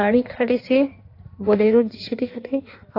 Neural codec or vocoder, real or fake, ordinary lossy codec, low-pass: codec, 16 kHz in and 24 kHz out, 1.1 kbps, FireRedTTS-2 codec; fake; AAC, 32 kbps; 5.4 kHz